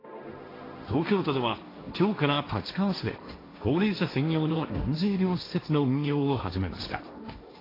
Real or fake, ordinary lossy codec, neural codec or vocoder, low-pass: fake; AAC, 24 kbps; codec, 16 kHz, 1.1 kbps, Voila-Tokenizer; 5.4 kHz